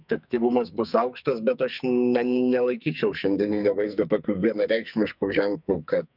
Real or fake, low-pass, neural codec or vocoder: fake; 5.4 kHz; codec, 44.1 kHz, 2.6 kbps, SNAC